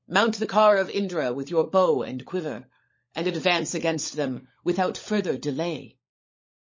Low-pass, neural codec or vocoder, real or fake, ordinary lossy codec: 7.2 kHz; codec, 16 kHz, 16 kbps, FunCodec, trained on LibriTTS, 50 frames a second; fake; MP3, 32 kbps